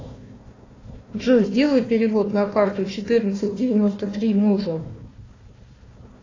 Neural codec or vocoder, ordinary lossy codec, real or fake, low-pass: codec, 16 kHz, 1 kbps, FunCodec, trained on Chinese and English, 50 frames a second; AAC, 32 kbps; fake; 7.2 kHz